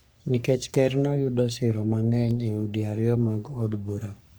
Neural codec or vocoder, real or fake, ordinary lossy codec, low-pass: codec, 44.1 kHz, 3.4 kbps, Pupu-Codec; fake; none; none